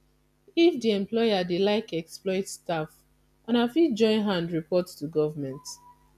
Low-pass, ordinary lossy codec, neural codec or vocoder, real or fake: 14.4 kHz; none; none; real